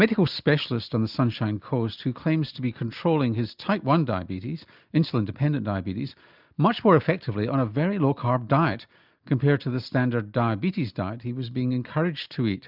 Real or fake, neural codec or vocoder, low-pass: real; none; 5.4 kHz